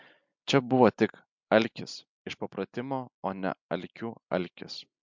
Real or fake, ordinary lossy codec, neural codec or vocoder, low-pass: real; MP3, 64 kbps; none; 7.2 kHz